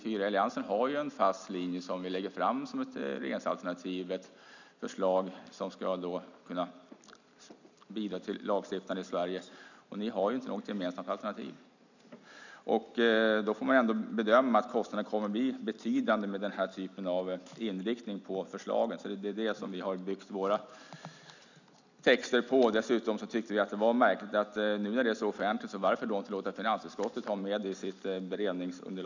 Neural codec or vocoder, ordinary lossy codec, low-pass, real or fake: none; none; 7.2 kHz; real